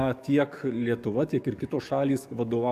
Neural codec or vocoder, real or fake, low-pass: codec, 44.1 kHz, 7.8 kbps, DAC; fake; 14.4 kHz